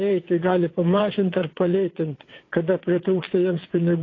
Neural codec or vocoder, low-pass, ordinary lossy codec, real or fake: vocoder, 22.05 kHz, 80 mel bands, WaveNeXt; 7.2 kHz; AAC, 32 kbps; fake